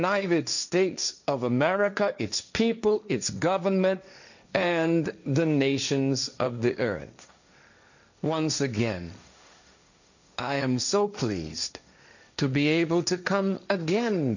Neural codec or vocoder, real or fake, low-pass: codec, 16 kHz, 1.1 kbps, Voila-Tokenizer; fake; 7.2 kHz